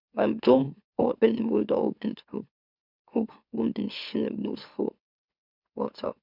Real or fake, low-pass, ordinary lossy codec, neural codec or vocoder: fake; 5.4 kHz; none; autoencoder, 44.1 kHz, a latent of 192 numbers a frame, MeloTTS